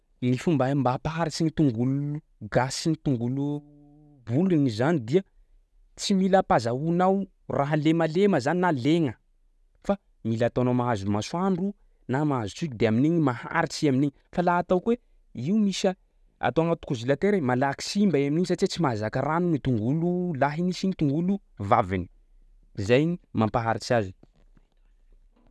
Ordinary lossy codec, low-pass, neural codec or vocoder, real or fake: none; none; none; real